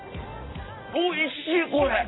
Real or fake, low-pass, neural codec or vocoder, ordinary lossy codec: real; 7.2 kHz; none; AAC, 16 kbps